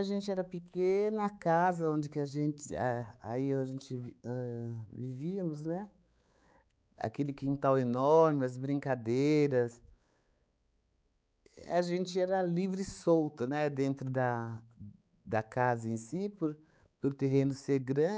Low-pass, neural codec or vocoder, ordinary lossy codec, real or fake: none; codec, 16 kHz, 4 kbps, X-Codec, HuBERT features, trained on balanced general audio; none; fake